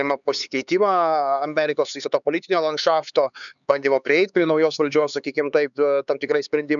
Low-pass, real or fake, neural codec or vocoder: 7.2 kHz; fake; codec, 16 kHz, 4 kbps, X-Codec, HuBERT features, trained on LibriSpeech